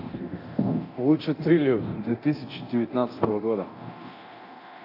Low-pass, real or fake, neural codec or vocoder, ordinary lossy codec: 5.4 kHz; fake; codec, 24 kHz, 0.9 kbps, DualCodec; AAC, 48 kbps